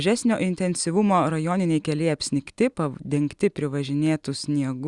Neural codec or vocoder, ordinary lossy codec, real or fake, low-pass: none; Opus, 64 kbps; real; 10.8 kHz